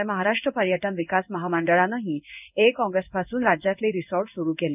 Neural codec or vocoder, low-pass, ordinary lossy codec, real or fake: codec, 16 kHz in and 24 kHz out, 1 kbps, XY-Tokenizer; 3.6 kHz; none; fake